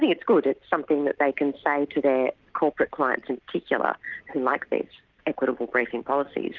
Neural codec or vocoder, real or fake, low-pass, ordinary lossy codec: none; real; 7.2 kHz; Opus, 24 kbps